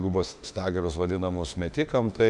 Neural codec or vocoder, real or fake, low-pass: autoencoder, 48 kHz, 32 numbers a frame, DAC-VAE, trained on Japanese speech; fake; 10.8 kHz